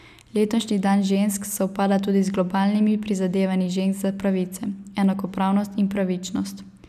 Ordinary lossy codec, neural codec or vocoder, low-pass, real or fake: none; none; 14.4 kHz; real